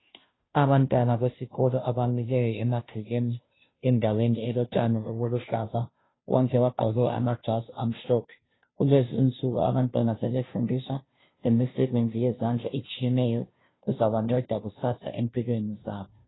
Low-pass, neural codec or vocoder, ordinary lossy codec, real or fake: 7.2 kHz; codec, 16 kHz, 0.5 kbps, FunCodec, trained on Chinese and English, 25 frames a second; AAC, 16 kbps; fake